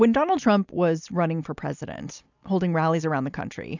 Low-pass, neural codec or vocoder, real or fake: 7.2 kHz; none; real